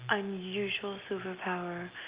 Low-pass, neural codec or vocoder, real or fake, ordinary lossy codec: 3.6 kHz; none; real; Opus, 24 kbps